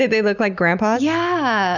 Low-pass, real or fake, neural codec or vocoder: 7.2 kHz; real; none